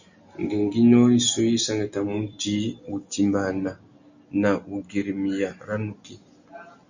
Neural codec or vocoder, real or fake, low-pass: none; real; 7.2 kHz